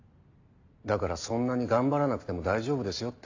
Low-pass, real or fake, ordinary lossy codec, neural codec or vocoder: 7.2 kHz; real; AAC, 48 kbps; none